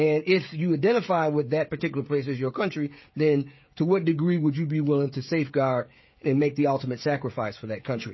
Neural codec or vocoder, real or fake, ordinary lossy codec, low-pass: codec, 16 kHz, 16 kbps, FreqCodec, smaller model; fake; MP3, 24 kbps; 7.2 kHz